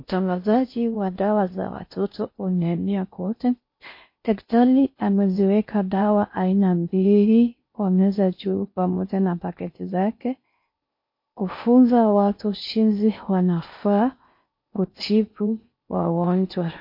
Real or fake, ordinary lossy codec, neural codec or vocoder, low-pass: fake; MP3, 32 kbps; codec, 16 kHz in and 24 kHz out, 0.6 kbps, FocalCodec, streaming, 4096 codes; 5.4 kHz